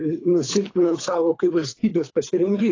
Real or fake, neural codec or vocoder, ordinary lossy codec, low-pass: fake; codec, 24 kHz, 3 kbps, HILCodec; AAC, 32 kbps; 7.2 kHz